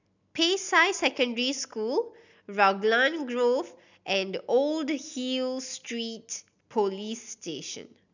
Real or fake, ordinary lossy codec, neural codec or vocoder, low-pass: real; none; none; 7.2 kHz